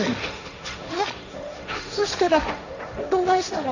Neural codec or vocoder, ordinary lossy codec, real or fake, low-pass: codec, 16 kHz, 1.1 kbps, Voila-Tokenizer; none; fake; 7.2 kHz